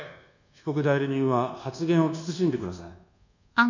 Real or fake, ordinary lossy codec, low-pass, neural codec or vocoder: fake; none; 7.2 kHz; codec, 24 kHz, 1.2 kbps, DualCodec